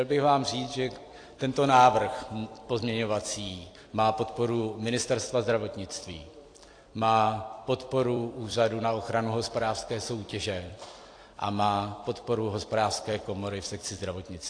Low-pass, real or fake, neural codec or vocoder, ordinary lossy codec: 9.9 kHz; fake; vocoder, 44.1 kHz, 128 mel bands every 256 samples, BigVGAN v2; AAC, 48 kbps